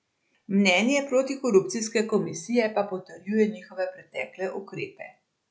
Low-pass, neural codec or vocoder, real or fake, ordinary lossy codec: none; none; real; none